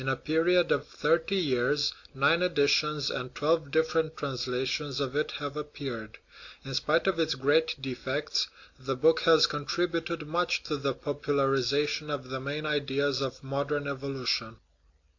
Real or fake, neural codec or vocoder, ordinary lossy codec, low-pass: real; none; AAC, 48 kbps; 7.2 kHz